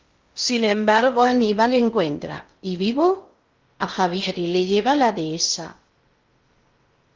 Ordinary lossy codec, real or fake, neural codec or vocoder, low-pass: Opus, 24 kbps; fake; codec, 16 kHz in and 24 kHz out, 0.6 kbps, FocalCodec, streaming, 2048 codes; 7.2 kHz